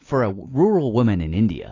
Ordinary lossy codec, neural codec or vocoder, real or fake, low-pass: AAC, 32 kbps; vocoder, 44.1 kHz, 128 mel bands every 256 samples, BigVGAN v2; fake; 7.2 kHz